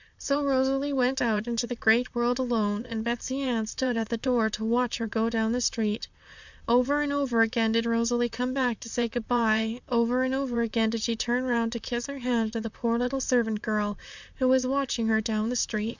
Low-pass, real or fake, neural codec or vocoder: 7.2 kHz; fake; vocoder, 44.1 kHz, 128 mel bands, Pupu-Vocoder